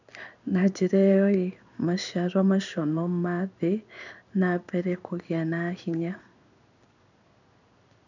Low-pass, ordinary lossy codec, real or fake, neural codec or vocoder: 7.2 kHz; none; fake; codec, 16 kHz in and 24 kHz out, 1 kbps, XY-Tokenizer